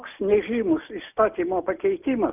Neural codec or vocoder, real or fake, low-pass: none; real; 3.6 kHz